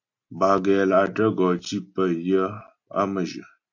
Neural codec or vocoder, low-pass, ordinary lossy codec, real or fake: none; 7.2 kHz; AAC, 48 kbps; real